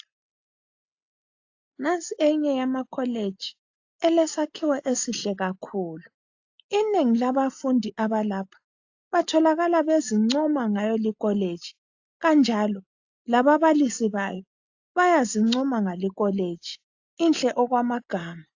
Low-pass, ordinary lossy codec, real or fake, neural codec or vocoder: 7.2 kHz; AAC, 48 kbps; real; none